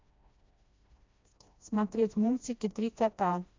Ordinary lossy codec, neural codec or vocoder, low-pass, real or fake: MP3, 64 kbps; codec, 16 kHz, 1 kbps, FreqCodec, smaller model; 7.2 kHz; fake